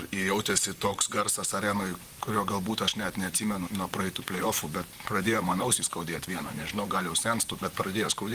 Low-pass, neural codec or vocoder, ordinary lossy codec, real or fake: 14.4 kHz; vocoder, 44.1 kHz, 128 mel bands, Pupu-Vocoder; Opus, 24 kbps; fake